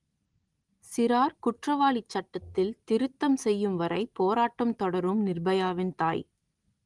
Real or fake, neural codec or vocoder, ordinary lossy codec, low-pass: real; none; Opus, 24 kbps; 10.8 kHz